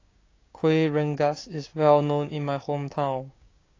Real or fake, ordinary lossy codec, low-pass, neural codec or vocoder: fake; AAC, 32 kbps; 7.2 kHz; codec, 16 kHz, 6 kbps, DAC